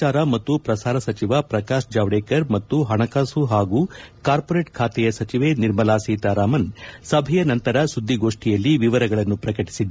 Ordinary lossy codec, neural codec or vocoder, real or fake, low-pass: none; none; real; none